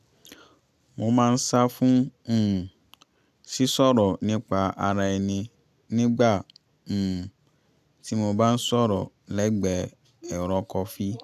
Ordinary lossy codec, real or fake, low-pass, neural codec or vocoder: none; real; 14.4 kHz; none